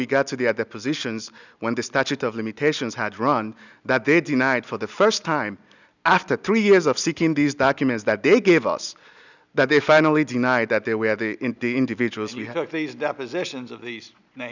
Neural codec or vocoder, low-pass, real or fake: none; 7.2 kHz; real